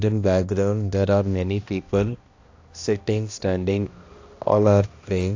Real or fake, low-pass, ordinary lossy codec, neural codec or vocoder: fake; 7.2 kHz; AAC, 48 kbps; codec, 16 kHz, 1 kbps, X-Codec, HuBERT features, trained on balanced general audio